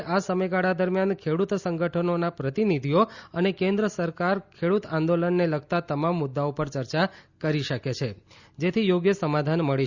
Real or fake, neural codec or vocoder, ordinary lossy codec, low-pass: real; none; Opus, 64 kbps; 7.2 kHz